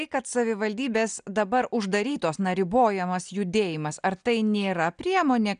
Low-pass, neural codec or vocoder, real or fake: 9.9 kHz; none; real